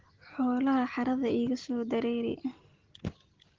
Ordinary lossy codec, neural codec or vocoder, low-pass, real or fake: Opus, 16 kbps; none; 7.2 kHz; real